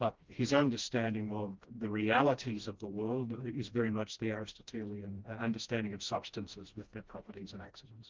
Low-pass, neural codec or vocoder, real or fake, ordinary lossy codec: 7.2 kHz; codec, 16 kHz, 1 kbps, FreqCodec, smaller model; fake; Opus, 32 kbps